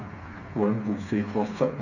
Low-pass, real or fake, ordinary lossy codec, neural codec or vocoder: 7.2 kHz; fake; none; codec, 16 kHz, 4 kbps, FreqCodec, smaller model